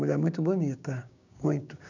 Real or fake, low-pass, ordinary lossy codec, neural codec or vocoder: fake; 7.2 kHz; none; codec, 16 kHz, 6 kbps, DAC